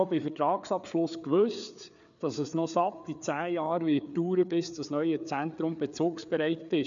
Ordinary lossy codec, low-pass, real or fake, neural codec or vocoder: MP3, 96 kbps; 7.2 kHz; fake; codec, 16 kHz, 4 kbps, FreqCodec, larger model